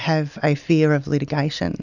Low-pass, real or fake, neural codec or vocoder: 7.2 kHz; real; none